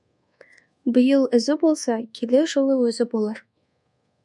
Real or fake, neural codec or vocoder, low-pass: fake; codec, 24 kHz, 1.2 kbps, DualCodec; 10.8 kHz